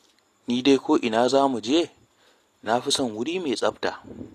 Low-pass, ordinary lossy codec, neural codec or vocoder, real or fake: 14.4 kHz; AAC, 48 kbps; none; real